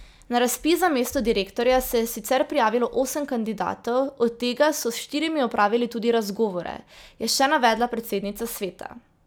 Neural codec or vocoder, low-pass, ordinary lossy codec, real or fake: none; none; none; real